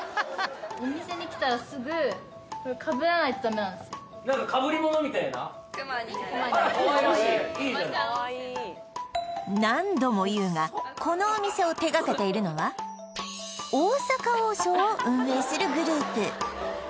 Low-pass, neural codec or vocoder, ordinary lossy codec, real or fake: none; none; none; real